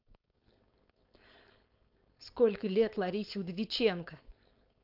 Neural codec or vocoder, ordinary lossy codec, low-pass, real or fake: codec, 16 kHz, 4.8 kbps, FACodec; AAC, 48 kbps; 5.4 kHz; fake